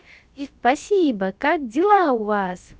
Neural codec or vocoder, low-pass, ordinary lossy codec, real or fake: codec, 16 kHz, about 1 kbps, DyCAST, with the encoder's durations; none; none; fake